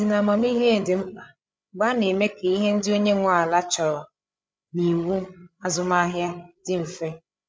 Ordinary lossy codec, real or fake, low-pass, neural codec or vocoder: none; fake; none; codec, 16 kHz, 8 kbps, FreqCodec, larger model